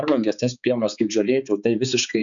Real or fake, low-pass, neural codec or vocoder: fake; 7.2 kHz; codec, 16 kHz, 4 kbps, X-Codec, HuBERT features, trained on balanced general audio